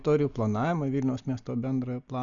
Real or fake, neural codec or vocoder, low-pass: real; none; 7.2 kHz